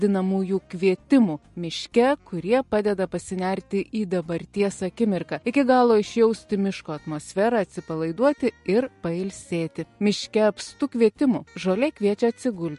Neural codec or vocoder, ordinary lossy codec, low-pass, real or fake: none; MP3, 48 kbps; 14.4 kHz; real